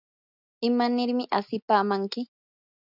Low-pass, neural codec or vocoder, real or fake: 5.4 kHz; none; real